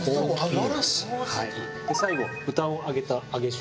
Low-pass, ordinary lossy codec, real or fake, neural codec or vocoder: none; none; real; none